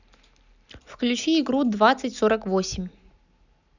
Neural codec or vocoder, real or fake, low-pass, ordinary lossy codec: none; real; 7.2 kHz; none